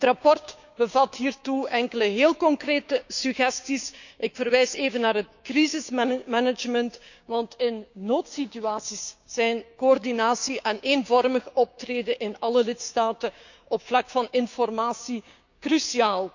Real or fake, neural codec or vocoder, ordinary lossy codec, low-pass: fake; codec, 16 kHz, 6 kbps, DAC; none; 7.2 kHz